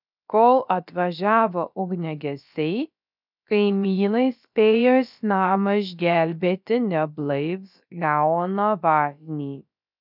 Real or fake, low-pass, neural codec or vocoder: fake; 5.4 kHz; codec, 16 kHz, about 1 kbps, DyCAST, with the encoder's durations